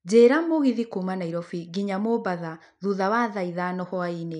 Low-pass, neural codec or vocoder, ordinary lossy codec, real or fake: 10.8 kHz; none; none; real